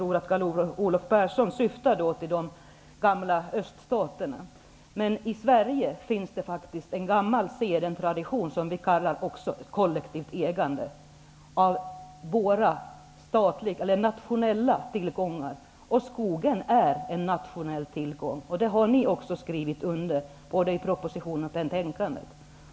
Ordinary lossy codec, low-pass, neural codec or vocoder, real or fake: none; none; none; real